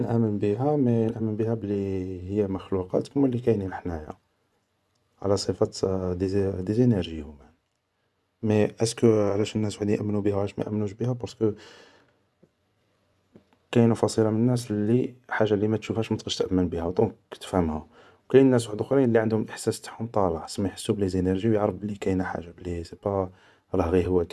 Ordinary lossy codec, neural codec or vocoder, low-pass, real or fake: none; vocoder, 24 kHz, 100 mel bands, Vocos; none; fake